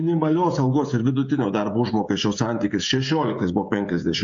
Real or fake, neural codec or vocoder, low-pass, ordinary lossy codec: fake; codec, 16 kHz, 16 kbps, FreqCodec, smaller model; 7.2 kHz; MP3, 64 kbps